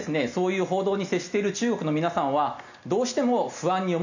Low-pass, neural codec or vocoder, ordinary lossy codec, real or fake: 7.2 kHz; none; none; real